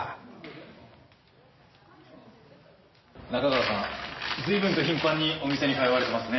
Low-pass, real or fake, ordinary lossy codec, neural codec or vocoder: 7.2 kHz; real; MP3, 24 kbps; none